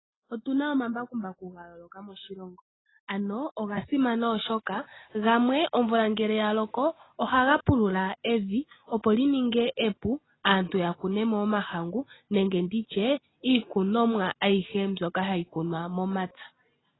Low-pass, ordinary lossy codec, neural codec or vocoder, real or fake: 7.2 kHz; AAC, 16 kbps; none; real